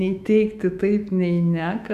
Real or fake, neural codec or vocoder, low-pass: fake; autoencoder, 48 kHz, 128 numbers a frame, DAC-VAE, trained on Japanese speech; 14.4 kHz